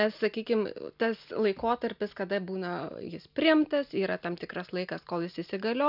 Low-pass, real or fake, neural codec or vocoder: 5.4 kHz; real; none